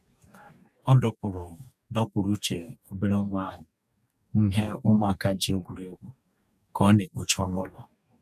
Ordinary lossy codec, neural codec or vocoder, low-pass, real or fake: none; codec, 44.1 kHz, 2.6 kbps, DAC; 14.4 kHz; fake